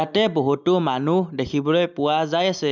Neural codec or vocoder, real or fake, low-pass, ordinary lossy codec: none; real; 7.2 kHz; none